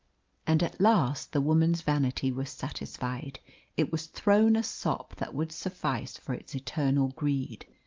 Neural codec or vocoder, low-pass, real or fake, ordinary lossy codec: none; 7.2 kHz; real; Opus, 32 kbps